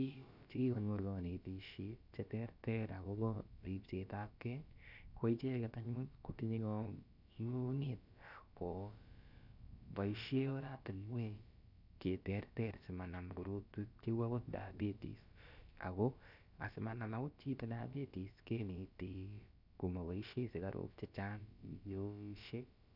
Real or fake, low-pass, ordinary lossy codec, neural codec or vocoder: fake; 5.4 kHz; none; codec, 16 kHz, about 1 kbps, DyCAST, with the encoder's durations